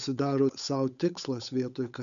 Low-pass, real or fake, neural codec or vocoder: 7.2 kHz; fake; codec, 16 kHz, 8 kbps, FunCodec, trained on Chinese and English, 25 frames a second